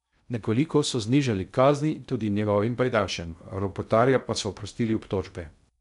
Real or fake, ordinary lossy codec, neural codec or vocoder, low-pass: fake; none; codec, 16 kHz in and 24 kHz out, 0.6 kbps, FocalCodec, streaming, 2048 codes; 10.8 kHz